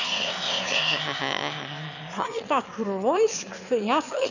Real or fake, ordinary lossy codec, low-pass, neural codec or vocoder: fake; none; 7.2 kHz; autoencoder, 22.05 kHz, a latent of 192 numbers a frame, VITS, trained on one speaker